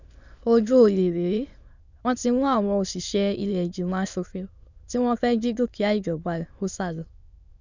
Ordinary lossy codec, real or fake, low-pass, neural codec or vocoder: none; fake; 7.2 kHz; autoencoder, 22.05 kHz, a latent of 192 numbers a frame, VITS, trained on many speakers